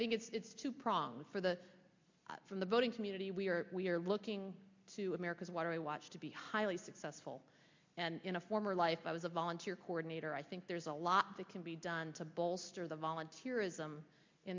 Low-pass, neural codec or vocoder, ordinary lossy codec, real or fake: 7.2 kHz; none; MP3, 48 kbps; real